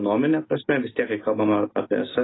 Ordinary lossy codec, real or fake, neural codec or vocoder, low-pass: AAC, 16 kbps; real; none; 7.2 kHz